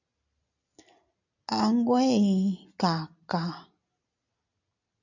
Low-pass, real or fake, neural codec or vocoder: 7.2 kHz; real; none